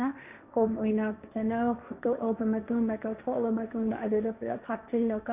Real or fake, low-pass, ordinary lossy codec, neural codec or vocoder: fake; 3.6 kHz; none; codec, 16 kHz, 1.1 kbps, Voila-Tokenizer